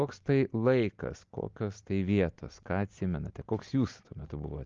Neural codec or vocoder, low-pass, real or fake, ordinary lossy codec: none; 7.2 kHz; real; Opus, 32 kbps